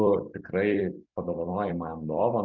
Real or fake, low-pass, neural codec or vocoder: fake; 7.2 kHz; vocoder, 44.1 kHz, 128 mel bands every 256 samples, BigVGAN v2